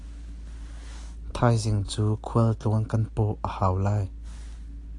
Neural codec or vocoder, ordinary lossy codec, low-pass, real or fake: none; AAC, 48 kbps; 10.8 kHz; real